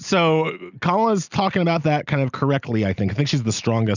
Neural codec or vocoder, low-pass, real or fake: none; 7.2 kHz; real